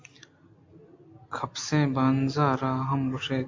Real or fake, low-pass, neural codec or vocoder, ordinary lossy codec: real; 7.2 kHz; none; MP3, 48 kbps